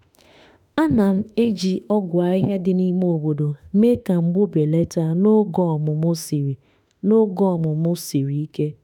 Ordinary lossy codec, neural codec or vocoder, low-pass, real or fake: none; autoencoder, 48 kHz, 32 numbers a frame, DAC-VAE, trained on Japanese speech; 19.8 kHz; fake